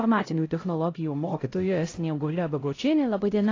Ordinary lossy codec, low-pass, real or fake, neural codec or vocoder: AAC, 32 kbps; 7.2 kHz; fake; codec, 16 kHz, 0.5 kbps, X-Codec, HuBERT features, trained on LibriSpeech